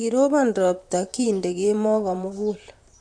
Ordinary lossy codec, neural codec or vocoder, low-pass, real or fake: AAC, 64 kbps; vocoder, 44.1 kHz, 128 mel bands, Pupu-Vocoder; 9.9 kHz; fake